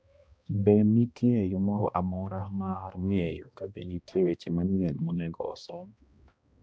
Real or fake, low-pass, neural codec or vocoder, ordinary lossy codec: fake; none; codec, 16 kHz, 1 kbps, X-Codec, HuBERT features, trained on balanced general audio; none